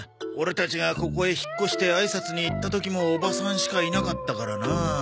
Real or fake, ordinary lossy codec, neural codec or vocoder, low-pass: real; none; none; none